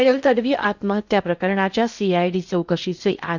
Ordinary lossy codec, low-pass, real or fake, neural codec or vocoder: none; 7.2 kHz; fake; codec, 16 kHz in and 24 kHz out, 0.6 kbps, FocalCodec, streaming, 2048 codes